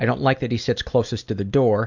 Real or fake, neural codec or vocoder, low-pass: real; none; 7.2 kHz